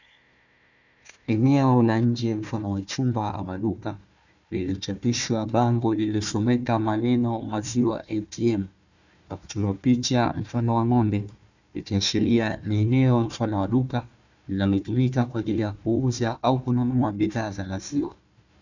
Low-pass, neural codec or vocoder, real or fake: 7.2 kHz; codec, 16 kHz, 1 kbps, FunCodec, trained on Chinese and English, 50 frames a second; fake